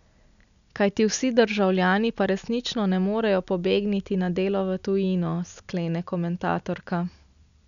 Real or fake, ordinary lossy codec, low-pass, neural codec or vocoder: real; none; 7.2 kHz; none